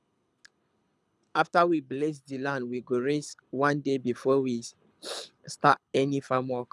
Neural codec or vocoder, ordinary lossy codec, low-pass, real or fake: codec, 24 kHz, 6 kbps, HILCodec; none; none; fake